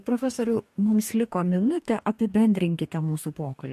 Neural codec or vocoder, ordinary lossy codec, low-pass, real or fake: codec, 44.1 kHz, 2.6 kbps, DAC; MP3, 64 kbps; 14.4 kHz; fake